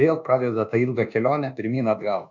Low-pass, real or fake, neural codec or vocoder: 7.2 kHz; fake; codec, 24 kHz, 1.2 kbps, DualCodec